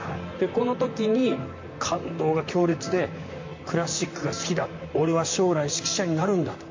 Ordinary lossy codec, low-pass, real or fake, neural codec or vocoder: MP3, 48 kbps; 7.2 kHz; fake; vocoder, 44.1 kHz, 128 mel bands, Pupu-Vocoder